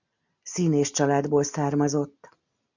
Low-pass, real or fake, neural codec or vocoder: 7.2 kHz; real; none